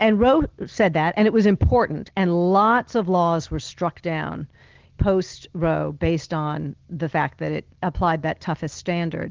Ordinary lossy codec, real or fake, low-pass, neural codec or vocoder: Opus, 16 kbps; real; 7.2 kHz; none